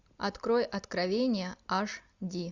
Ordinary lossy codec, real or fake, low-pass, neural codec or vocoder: Opus, 64 kbps; real; 7.2 kHz; none